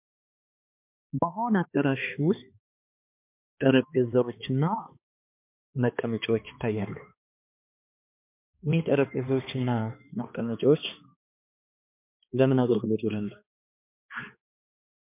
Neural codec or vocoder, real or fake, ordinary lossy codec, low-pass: codec, 16 kHz, 2 kbps, X-Codec, HuBERT features, trained on balanced general audio; fake; MP3, 32 kbps; 3.6 kHz